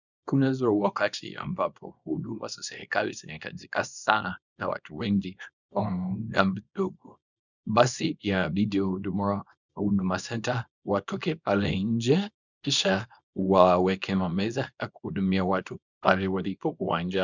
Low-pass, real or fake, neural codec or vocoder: 7.2 kHz; fake; codec, 24 kHz, 0.9 kbps, WavTokenizer, small release